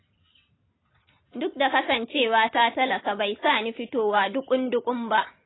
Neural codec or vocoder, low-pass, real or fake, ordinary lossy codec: none; 7.2 kHz; real; AAC, 16 kbps